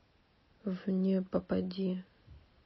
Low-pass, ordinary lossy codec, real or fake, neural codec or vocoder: 7.2 kHz; MP3, 24 kbps; real; none